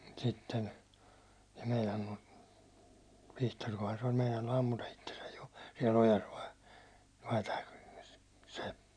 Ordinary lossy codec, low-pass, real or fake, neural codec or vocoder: none; 9.9 kHz; real; none